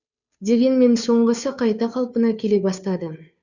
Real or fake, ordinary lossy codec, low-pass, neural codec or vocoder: fake; none; 7.2 kHz; codec, 16 kHz, 2 kbps, FunCodec, trained on Chinese and English, 25 frames a second